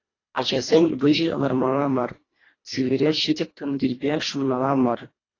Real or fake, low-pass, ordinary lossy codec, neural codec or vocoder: fake; 7.2 kHz; AAC, 32 kbps; codec, 24 kHz, 1.5 kbps, HILCodec